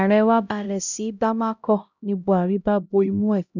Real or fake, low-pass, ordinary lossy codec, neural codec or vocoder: fake; 7.2 kHz; none; codec, 16 kHz, 0.5 kbps, X-Codec, HuBERT features, trained on LibriSpeech